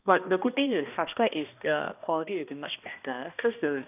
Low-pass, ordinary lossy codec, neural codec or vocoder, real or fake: 3.6 kHz; none; codec, 16 kHz, 1 kbps, X-Codec, HuBERT features, trained on balanced general audio; fake